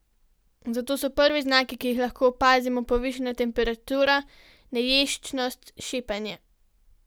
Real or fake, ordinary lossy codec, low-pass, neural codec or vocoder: real; none; none; none